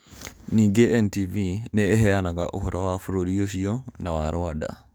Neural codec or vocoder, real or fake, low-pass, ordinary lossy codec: codec, 44.1 kHz, 7.8 kbps, DAC; fake; none; none